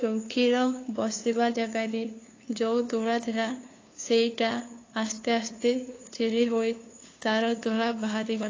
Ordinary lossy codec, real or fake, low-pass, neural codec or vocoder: AAC, 32 kbps; fake; 7.2 kHz; codec, 16 kHz, 2 kbps, FunCodec, trained on LibriTTS, 25 frames a second